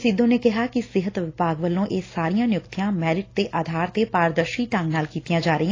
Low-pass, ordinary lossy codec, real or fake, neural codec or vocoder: 7.2 kHz; AAC, 32 kbps; real; none